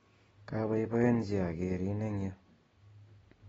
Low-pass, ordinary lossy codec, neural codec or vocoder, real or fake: 10.8 kHz; AAC, 24 kbps; none; real